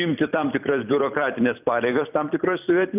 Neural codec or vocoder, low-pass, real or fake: vocoder, 44.1 kHz, 128 mel bands every 256 samples, BigVGAN v2; 3.6 kHz; fake